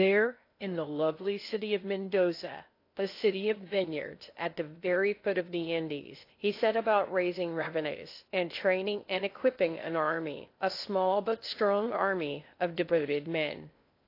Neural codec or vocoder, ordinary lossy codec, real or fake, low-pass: codec, 16 kHz in and 24 kHz out, 0.6 kbps, FocalCodec, streaming, 2048 codes; AAC, 32 kbps; fake; 5.4 kHz